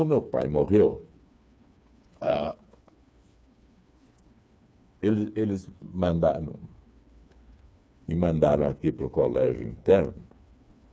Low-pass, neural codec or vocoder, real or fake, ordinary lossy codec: none; codec, 16 kHz, 4 kbps, FreqCodec, smaller model; fake; none